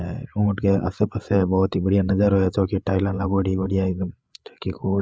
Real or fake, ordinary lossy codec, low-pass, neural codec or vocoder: fake; none; none; codec, 16 kHz, 8 kbps, FreqCodec, larger model